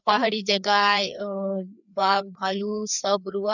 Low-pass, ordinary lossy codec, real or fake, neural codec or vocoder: 7.2 kHz; none; fake; codec, 16 kHz, 2 kbps, FreqCodec, larger model